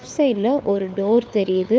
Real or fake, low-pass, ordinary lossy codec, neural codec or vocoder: fake; none; none; codec, 16 kHz, 4 kbps, FunCodec, trained on LibriTTS, 50 frames a second